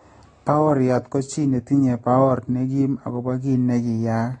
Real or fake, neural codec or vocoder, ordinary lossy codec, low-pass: real; none; AAC, 32 kbps; 9.9 kHz